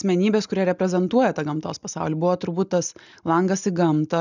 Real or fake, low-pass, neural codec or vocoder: real; 7.2 kHz; none